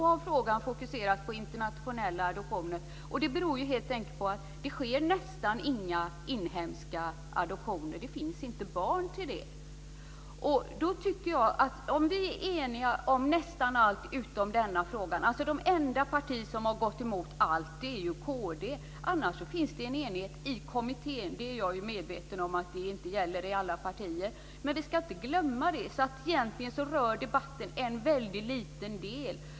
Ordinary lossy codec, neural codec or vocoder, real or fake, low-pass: none; none; real; none